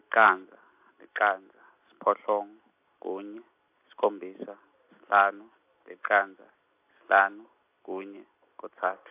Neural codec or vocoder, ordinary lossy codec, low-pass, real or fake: none; AAC, 32 kbps; 3.6 kHz; real